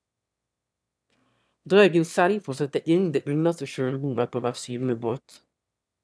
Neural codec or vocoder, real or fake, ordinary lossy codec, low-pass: autoencoder, 22.05 kHz, a latent of 192 numbers a frame, VITS, trained on one speaker; fake; none; none